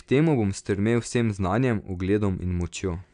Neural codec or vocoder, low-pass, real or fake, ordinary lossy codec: none; 9.9 kHz; real; none